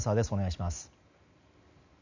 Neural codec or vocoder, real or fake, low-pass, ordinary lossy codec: none; real; 7.2 kHz; none